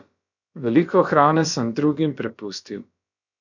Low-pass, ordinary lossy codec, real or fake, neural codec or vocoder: 7.2 kHz; none; fake; codec, 16 kHz, about 1 kbps, DyCAST, with the encoder's durations